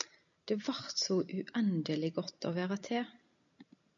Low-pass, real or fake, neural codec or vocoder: 7.2 kHz; real; none